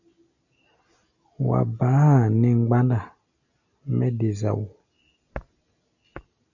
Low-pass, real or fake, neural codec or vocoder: 7.2 kHz; real; none